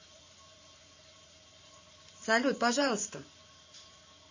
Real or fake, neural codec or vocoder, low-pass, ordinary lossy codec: fake; vocoder, 44.1 kHz, 128 mel bands every 512 samples, BigVGAN v2; 7.2 kHz; MP3, 32 kbps